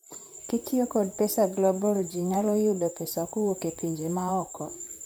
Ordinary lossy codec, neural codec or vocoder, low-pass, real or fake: none; vocoder, 44.1 kHz, 128 mel bands, Pupu-Vocoder; none; fake